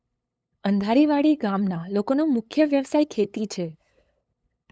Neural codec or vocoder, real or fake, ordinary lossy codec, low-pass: codec, 16 kHz, 8 kbps, FunCodec, trained on LibriTTS, 25 frames a second; fake; none; none